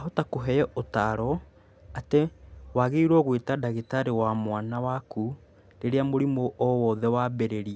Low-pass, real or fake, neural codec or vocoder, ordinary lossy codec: none; real; none; none